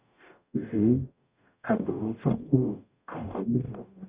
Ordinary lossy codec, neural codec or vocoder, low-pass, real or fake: Opus, 64 kbps; codec, 44.1 kHz, 0.9 kbps, DAC; 3.6 kHz; fake